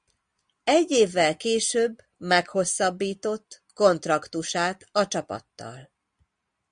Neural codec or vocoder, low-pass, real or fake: none; 9.9 kHz; real